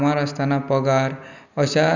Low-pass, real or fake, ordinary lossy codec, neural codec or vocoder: 7.2 kHz; real; none; none